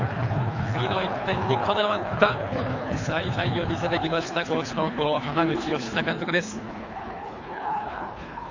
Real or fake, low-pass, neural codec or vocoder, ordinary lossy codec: fake; 7.2 kHz; codec, 24 kHz, 3 kbps, HILCodec; AAC, 48 kbps